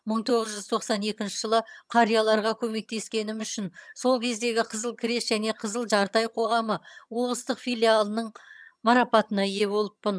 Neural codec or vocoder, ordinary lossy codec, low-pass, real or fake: vocoder, 22.05 kHz, 80 mel bands, HiFi-GAN; none; none; fake